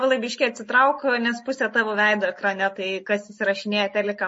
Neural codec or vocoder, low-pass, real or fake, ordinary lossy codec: none; 10.8 kHz; real; MP3, 32 kbps